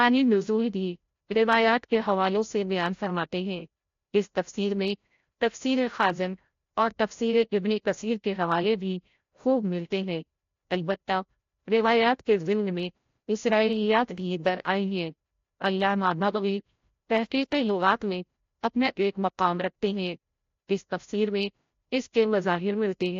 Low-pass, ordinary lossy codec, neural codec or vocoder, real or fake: 7.2 kHz; AAC, 48 kbps; codec, 16 kHz, 0.5 kbps, FreqCodec, larger model; fake